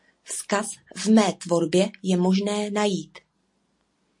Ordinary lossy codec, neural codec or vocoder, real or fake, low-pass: MP3, 48 kbps; none; real; 10.8 kHz